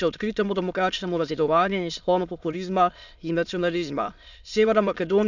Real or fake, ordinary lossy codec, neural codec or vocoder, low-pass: fake; none; autoencoder, 22.05 kHz, a latent of 192 numbers a frame, VITS, trained on many speakers; 7.2 kHz